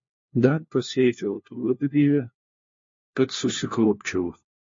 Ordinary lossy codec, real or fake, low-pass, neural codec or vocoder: MP3, 32 kbps; fake; 7.2 kHz; codec, 16 kHz, 1 kbps, FunCodec, trained on LibriTTS, 50 frames a second